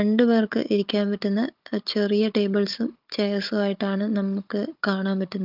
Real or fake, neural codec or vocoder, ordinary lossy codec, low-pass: fake; codec, 16 kHz, 16 kbps, FunCodec, trained on Chinese and English, 50 frames a second; Opus, 24 kbps; 5.4 kHz